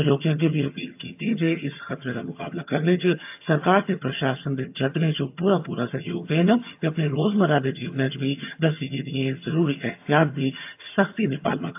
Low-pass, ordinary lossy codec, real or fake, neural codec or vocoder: 3.6 kHz; AAC, 32 kbps; fake; vocoder, 22.05 kHz, 80 mel bands, HiFi-GAN